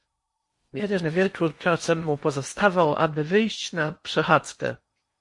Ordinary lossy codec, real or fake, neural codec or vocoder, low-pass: MP3, 48 kbps; fake; codec, 16 kHz in and 24 kHz out, 0.8 kbps, FocalCodec, streaming, 65536 codes; 10.8 kHz